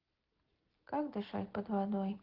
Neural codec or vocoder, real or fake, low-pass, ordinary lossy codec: none; real; 5.4 kHz; Opus, 16 kbps